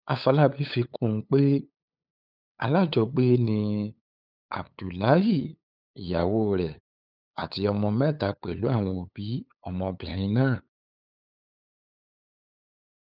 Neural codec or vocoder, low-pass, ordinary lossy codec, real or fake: codec, 16 kHz, 8 kbps, FunCodec, trained on LibriTTS, 25 frames a second; 5.4 kHz; none; fake